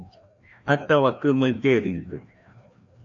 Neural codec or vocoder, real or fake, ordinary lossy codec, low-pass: codec, 16 kHz, 1 kbps, FreqCodec, larger model; fake; AAC, 64 kbps; 7.2 kHz